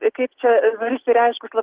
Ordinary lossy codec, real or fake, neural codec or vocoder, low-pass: Opus, 16 kbps; real; none; 3.6 kHz